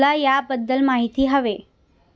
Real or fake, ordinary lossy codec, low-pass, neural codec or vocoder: real; none; none; none